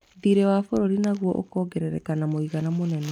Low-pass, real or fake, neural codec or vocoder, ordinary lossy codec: 19.8 kHz; real; none; none